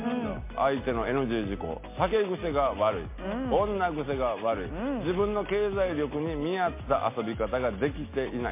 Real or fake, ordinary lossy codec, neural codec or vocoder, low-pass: real; MP3, 24 kbps; none; 3.6 kHz